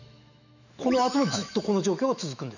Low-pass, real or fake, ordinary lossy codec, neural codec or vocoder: 7.2 kHz; fake; none; autoencoder, 48 kHz, 128 numbers a frame, DAC-VAE, trained on Japanese speech